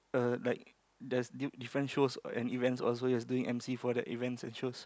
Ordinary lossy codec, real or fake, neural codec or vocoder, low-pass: none; real; none; none